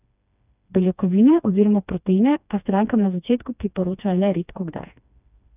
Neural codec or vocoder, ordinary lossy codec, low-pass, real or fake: codec, 16 kHz, 2 kbps, FreqCodec, smaller model; none; 3.6 kHz; fake